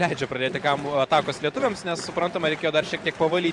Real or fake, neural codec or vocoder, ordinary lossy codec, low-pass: fake; vocoder, 44.1 kHz, 128 mel bands every 512 samples, BigVGAN v2; Opus, 64 kbps; 10.8 kHz